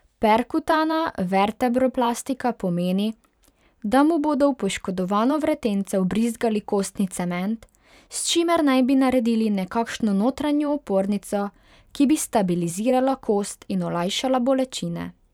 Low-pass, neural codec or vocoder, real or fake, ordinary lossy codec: 19.8 kHz; vocoder, 44.1 kHz, 128 mel bands every 512 samples, BigVGAN v2; fake; none